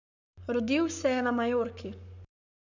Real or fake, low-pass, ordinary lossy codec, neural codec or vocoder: fake; 7.2 kHz; none; codec, 44.1 kHz, 7.8 kbps, Pupu-Codec